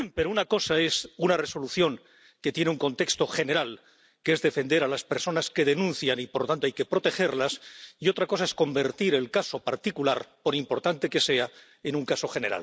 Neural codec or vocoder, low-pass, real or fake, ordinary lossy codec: none; none; real; none